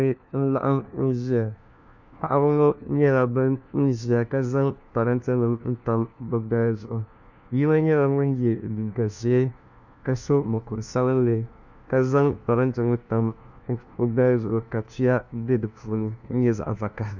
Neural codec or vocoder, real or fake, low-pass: codec, 16 kHz, 1 kbps, FunCodec, trained on LibriTTS, 50 frames a second; fake; 7.2 kHz